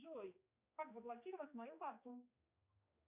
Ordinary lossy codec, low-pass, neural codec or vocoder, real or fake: Opus, 64 kbps; 3.6 kHz; codec, 16 kHz, 2 kbps, X-Codec, HuBERT features, trained on general audio; fake